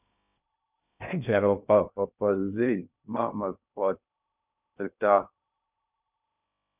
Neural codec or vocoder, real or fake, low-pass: codec, 16 kHz in and 24 kHz out, 0.6 kbps, FocalCodec, streaming, 2048 codes; fake; 3.6 kHz